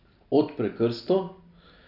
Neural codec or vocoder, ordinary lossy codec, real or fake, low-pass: none; none; real; 5.4 kHz